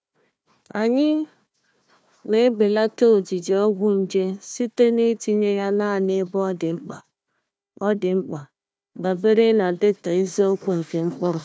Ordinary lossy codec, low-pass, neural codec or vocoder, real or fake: none; none; codec, 16 kHz, 1 kbps, FunCodec, trained on Chinese and English, 50 frames a second; fake